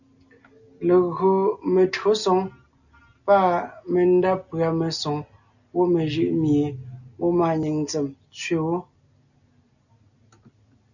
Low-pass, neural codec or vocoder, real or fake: 7.2 kHz; none; real